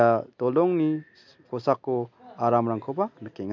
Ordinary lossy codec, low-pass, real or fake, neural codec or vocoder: MP3, 64 kbps; 7.2 kHz; real; none